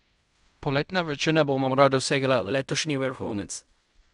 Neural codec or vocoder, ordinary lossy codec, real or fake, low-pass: codec, 16 kHz in and 24 kHz out, 0.4 kbps, LongCat-Audio-Codec, fine tuned four codebook decoder; none; fake; 10.8 kHz